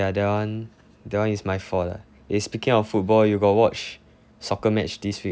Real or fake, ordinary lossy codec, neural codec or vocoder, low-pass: real; none; none; none